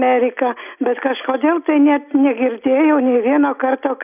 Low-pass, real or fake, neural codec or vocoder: 3.6 kHz; real; none